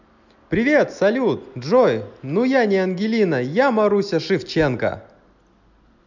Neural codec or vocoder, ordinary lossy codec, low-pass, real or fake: none; none; 7.2 kHz; real